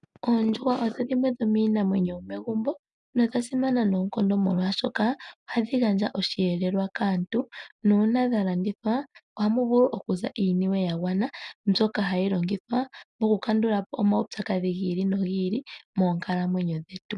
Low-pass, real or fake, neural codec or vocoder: 10.8 kHz; real; none